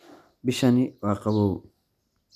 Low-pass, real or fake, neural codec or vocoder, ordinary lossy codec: 14.4 kHz; real; none; none